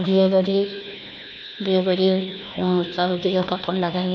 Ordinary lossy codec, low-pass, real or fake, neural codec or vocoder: none; none; fake; codec, 16 kHz, 1 kbps, FunCodec, trained on Chinese and English, 50 frames a second